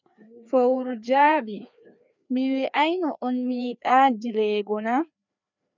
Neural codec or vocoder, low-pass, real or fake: codec, 16 kHz, 2 kbps, FreqCodec, larger model; 7.2 kHz; fake